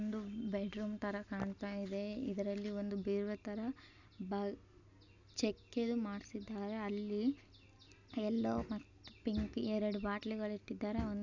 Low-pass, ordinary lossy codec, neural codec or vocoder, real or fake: 7.2 kHz; none; none; real